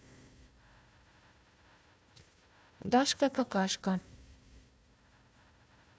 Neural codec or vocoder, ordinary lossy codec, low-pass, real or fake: codec, 16 kHz, 1 kbps, FunCodec, trained on Chinese and English, 50 frames a second; none; none; fake